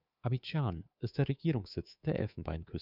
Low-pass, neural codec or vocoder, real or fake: 5.4 kHz; codec, 24 kHz, 3.1 kbps, DualCodec; fake